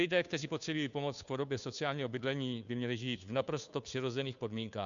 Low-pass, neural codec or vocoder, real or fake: 7.2 kHz; codec, 16 kHz, 2 kbps, FunCodec, trained on Chinese and English, 25 frames a second; fake